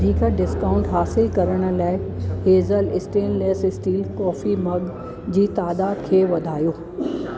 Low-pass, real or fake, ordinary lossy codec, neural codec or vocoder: none; real; none; none